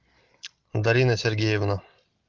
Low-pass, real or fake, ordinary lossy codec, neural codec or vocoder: 7.2 kHz; real; Opus, 32 kbps; none